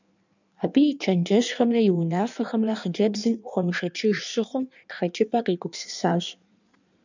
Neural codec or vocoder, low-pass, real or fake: codec, 16 kHz in and 24 kHz out, 1.1 kbps, FireRedTTS-2 codec; 7.2 kHz; fake